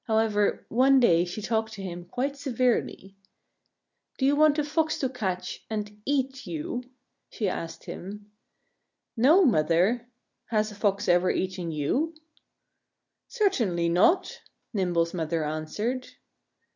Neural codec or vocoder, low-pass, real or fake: none; 7.2 kHz; real